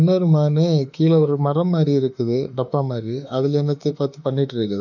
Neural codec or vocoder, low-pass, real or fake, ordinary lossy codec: autoencoder, 48 kHz, 32 numbers a frame, DAC-VAE, trained on Japanese speech; 7.2 kHz; fake; none